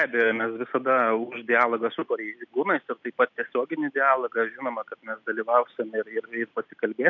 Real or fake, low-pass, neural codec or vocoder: real; 7.2 kHz; none